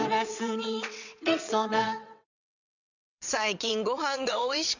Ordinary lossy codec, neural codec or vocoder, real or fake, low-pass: none; vocoder, 22.05 kHz, 80 mel bands, WaveNeXt; fake; 7.2 kHz